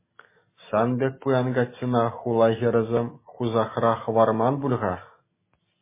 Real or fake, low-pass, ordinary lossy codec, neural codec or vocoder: real; 3.6 kHz; MP3, 16 kbps; none